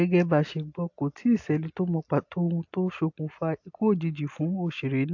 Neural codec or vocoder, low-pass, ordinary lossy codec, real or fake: none; 7.2 kHz; AAC, 48 kbps; real